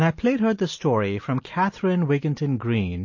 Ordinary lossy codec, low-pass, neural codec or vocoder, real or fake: MP3, 32 kbps; 7.2 kHz; none; real